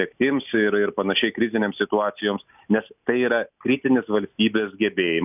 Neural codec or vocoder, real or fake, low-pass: none; real; 3.6 kHz